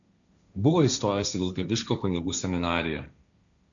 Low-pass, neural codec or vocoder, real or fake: 7.2 kHz; codec, 16 kHz, 1.1 kbps, Voila-Tokenizer; fake